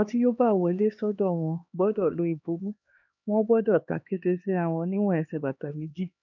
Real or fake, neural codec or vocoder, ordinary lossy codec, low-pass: fake; codec, 16 kHz, 2 kbps, X-Codec, HuBERT features, trained on LibriSpeech; none; 7.2 kHz